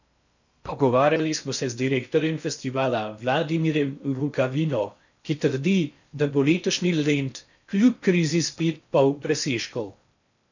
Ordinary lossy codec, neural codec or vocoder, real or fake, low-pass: none; codec, 16 kHz in and 24 kHz out, 0.6 kbps, FocalCodec, streaming, 2048 codes; fake; 7.2 kHz